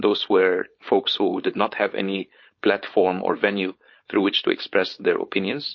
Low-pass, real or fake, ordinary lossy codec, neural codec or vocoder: 7.2 kHz; fake; MP3, 32 kbps; codec, 16 kHz, 4.8 kbps, FACodec